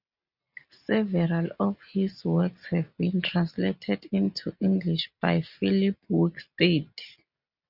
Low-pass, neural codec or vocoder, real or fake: 5.4 kHz; none; real